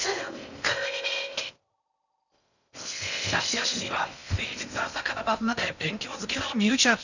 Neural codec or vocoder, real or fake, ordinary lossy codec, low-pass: codec, 16 kHz in and 24 kHz out, 0.6 kbps, FocalCodec, streaming, 4096 codes; fake; none; 7.2 kHz